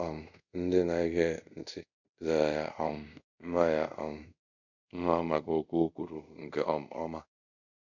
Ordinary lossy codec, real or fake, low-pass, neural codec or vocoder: none; fake; 7.2 kHz; codec, 24 kHz, 0.5 kbps, DualCodec